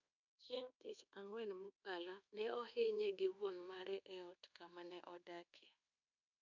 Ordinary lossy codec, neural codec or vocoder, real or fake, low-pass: none; codec, 24 kHz, 1.2 kbps, DualCodec; fake; 7.2 kHz